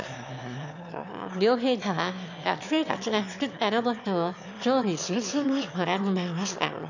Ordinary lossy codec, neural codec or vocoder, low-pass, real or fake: none; autoencoder, 22.05 kHz, a latent of 192 numbers a frame, VITS, trained on one speaker; 7.2 kHz; fake